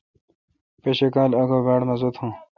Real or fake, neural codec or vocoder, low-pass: real; none; 7.2 kHz